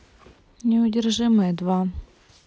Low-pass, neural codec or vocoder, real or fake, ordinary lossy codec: none; none; real; none